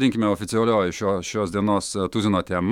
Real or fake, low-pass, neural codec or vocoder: fake; 19.8 kHz; autoencoder, 48 kHz, 128 numbers a frame, DAC-VAE, trained on Japanese speech